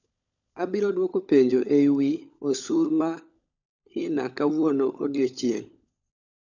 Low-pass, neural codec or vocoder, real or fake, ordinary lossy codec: 7.2 kHz; codec, 16 kHz, 16 kbps, FunCodec, trained on LibriTTS, 50 frames a second; fake; none